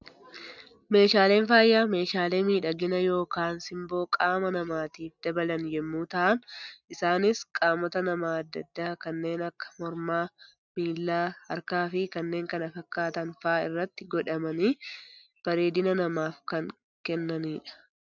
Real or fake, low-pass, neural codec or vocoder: real; 7.2 kHz; none